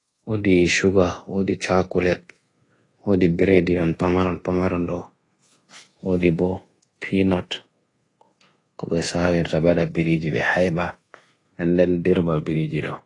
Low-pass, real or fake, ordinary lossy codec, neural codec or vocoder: 10.8 kHz; fake; AAC, 32 kbps; codec, 24 kHz, 1.2 kbps, DualCodec